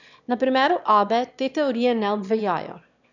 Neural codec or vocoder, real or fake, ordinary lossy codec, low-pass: autoencoder, 22.05 kHz, a latent of 192 numbers a frame, VITS, trained on one speaker; fake; none; 7.2 kHz